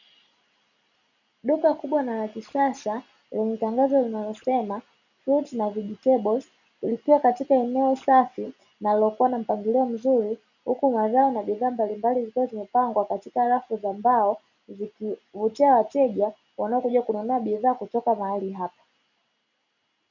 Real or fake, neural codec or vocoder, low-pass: real; none; 7.2 kHz